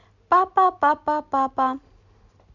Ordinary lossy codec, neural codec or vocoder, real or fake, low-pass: none; none; real; 7.2 kHz